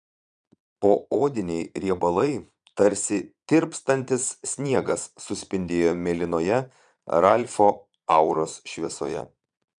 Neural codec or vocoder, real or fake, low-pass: vocoder, 22.05 kHz, 80 mel bands, Vocos; fake; 9.9 kHz